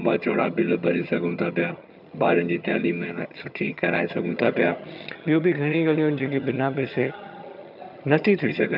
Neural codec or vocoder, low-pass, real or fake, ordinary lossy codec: vocoder, 22.05 kHz, 80 mel bands, HiFi-GAN; 5.4 kHz; fake; none